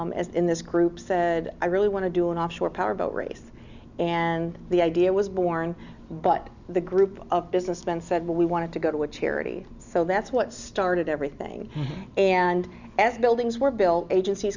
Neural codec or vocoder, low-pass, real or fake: none; 7.2 kHz; real